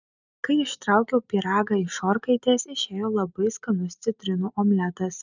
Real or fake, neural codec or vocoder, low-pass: real; none; 7.2 kHz